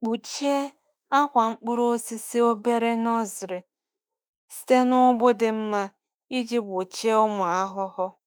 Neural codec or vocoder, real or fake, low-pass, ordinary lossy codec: autoencoder, 48 kHz, 32 numbers a frame, DAC-VAE, trained on Japanese speech; fake; none; none